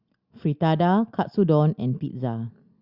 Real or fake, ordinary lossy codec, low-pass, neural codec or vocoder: real; Opus, 64 kbps; 5.4 kHz; none